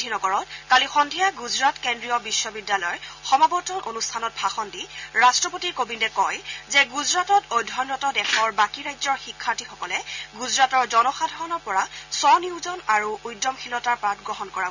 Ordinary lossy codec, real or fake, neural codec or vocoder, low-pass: none; real; none; 7.2 kHz